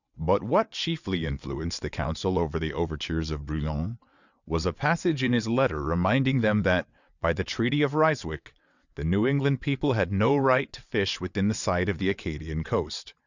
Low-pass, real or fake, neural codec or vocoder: 7.2 kHz; fake; vocoder, 22.05 kHz, 80 mel bands, WaveNeXt